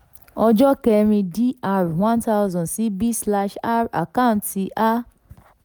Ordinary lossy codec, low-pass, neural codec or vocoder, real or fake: none; none; none; real